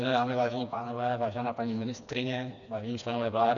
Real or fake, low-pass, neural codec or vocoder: fake; 7.2 kHz; codec, 16 kHz, 2 kbps, FreqCodec, smaller model